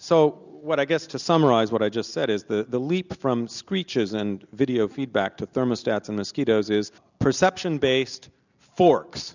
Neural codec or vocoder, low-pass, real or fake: none; 7.2 kHz; real